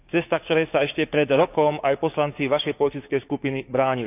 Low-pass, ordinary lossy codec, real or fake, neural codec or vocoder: 3.6 kHz; none; fake; codec, 16 kHz, 6 kbps, DAC